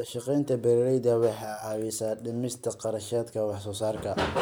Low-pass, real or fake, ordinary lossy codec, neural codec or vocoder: none; real; none; none